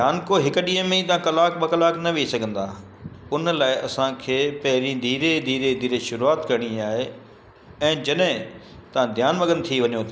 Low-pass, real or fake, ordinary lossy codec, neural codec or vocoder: none; real; none; none